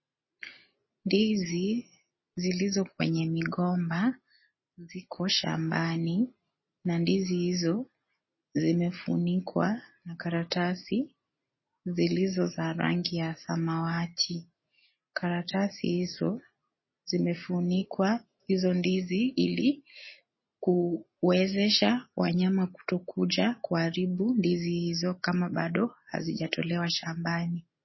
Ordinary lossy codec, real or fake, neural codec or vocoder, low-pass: MP3, 24 kbps; real; none; 7.2 kHz